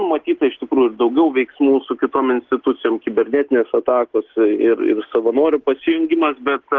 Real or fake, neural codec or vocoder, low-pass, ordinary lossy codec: real; none; 7.2 kHz; Opus, 16 kbps